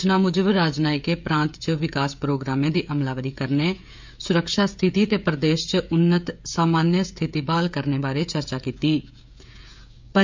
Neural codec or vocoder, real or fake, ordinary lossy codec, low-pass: codec, 16 kHz, 16 kbps, FreqCodec, smaller model; fake; MP3, 64 kbps; 7.2 kHz